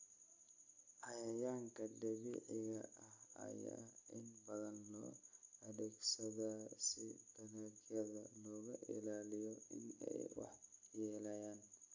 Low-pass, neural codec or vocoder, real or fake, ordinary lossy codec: 7.2 kHz; none; real; AAC, 48 kbps